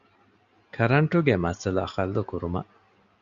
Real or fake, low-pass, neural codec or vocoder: real; 7.2 kHz; none